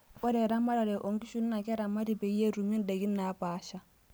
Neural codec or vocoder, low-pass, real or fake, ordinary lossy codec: none; none; real; none